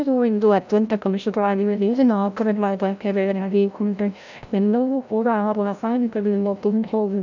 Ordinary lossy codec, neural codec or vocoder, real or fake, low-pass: none; codec, 16 kHz, 0.5 kbps, FreqCodec, larger model; fake; 7.2 kHz